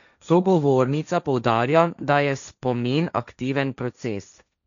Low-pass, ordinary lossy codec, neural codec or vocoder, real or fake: 7.2 kHz; none; codec, 16 kHz, 1.1 kbps, Voila-Tokenizer; fake